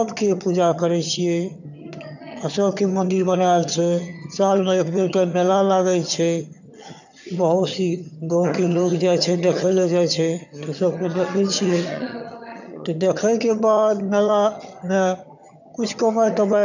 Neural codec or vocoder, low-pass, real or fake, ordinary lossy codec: vocoder, 22.05 kHz, 80 mel bands, HiFi-GAN; 7.2 kHz; fake; none